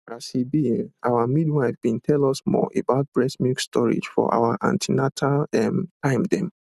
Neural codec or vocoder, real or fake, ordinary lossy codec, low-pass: none; real; none; 14.4 kHz